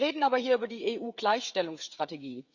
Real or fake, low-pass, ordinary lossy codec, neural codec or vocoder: fake; 7.2 kHz; Opus, 64 kbps; codec, 16 kHz, 16 kbps, FreqCodec, smaller model